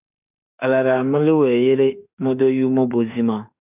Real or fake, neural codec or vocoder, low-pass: fake; autoencoder, 48 kHz, 32 numbers a frame, DAC-VAE, trained on Japanese speech; 3.6 kHz